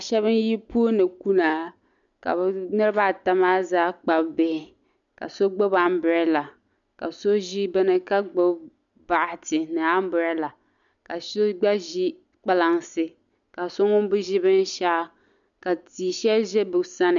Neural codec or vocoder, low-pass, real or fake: none; 7.2 kHz; real